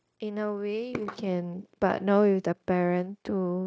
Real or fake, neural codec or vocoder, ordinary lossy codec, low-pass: fake; codec, 16 kHz, 0.9 kbps, LongCat-Audio-Codec; none; none